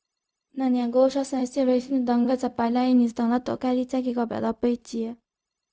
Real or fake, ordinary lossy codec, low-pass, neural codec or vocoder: fake; none; none; codec, 16 kHz, 0.4 kbps, LongCat-Audio-Codec